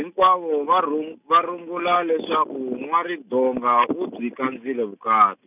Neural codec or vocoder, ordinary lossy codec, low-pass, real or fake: none; none; 3.6 kHz; real